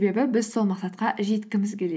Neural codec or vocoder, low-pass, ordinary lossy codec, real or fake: none; none; none; real